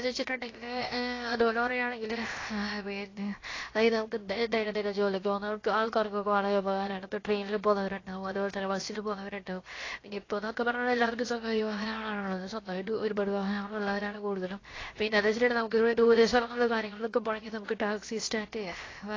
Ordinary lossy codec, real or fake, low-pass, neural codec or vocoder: AAC, 32 kbps; fake; 7.2 kHz; codec, 16 kHz, 0.7 kbps, FocalCodec